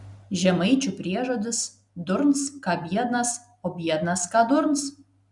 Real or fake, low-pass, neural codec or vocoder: real; 10.8 kHz; none